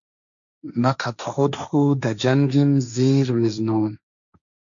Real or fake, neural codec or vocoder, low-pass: fake; codec, 16 kHz, 1.1 kbps, Voila-Tokenizer; 7.2 kHz